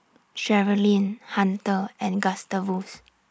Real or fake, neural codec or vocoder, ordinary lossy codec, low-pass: real; none; none; none